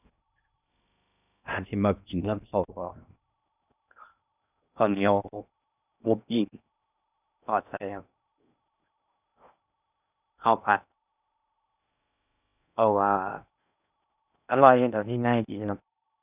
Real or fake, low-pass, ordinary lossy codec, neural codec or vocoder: fake; 3.6 kHz; none; codec, 16 kHz in and 24 kHz out, 0.6 kbps, FocalCodec, streaming, 2048 codes